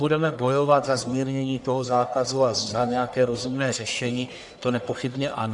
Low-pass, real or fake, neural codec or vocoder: 10.8 kHz; fake; codec, 44.1 kHz, 1.7 kbps, Pupu-Codec